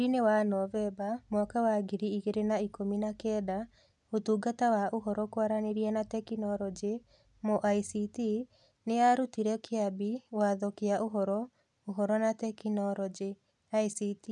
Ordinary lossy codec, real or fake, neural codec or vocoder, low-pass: none; real; none; 10.8 kHz